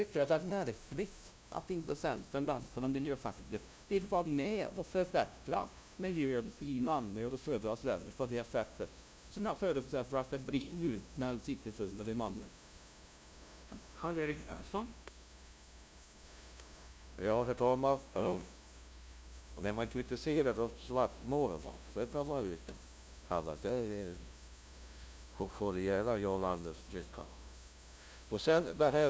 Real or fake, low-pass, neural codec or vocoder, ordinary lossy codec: fake; none; codec, 16 kHz, 0.5 kbps, FunCodec, trained on LibriTTS, 25 frames a second; none